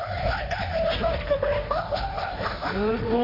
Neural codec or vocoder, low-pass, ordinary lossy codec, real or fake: codec, 16 kHz, 1.1 kbps, Voila-Tokenizer; 5.4 kHz; AAC, 24 kbps; fake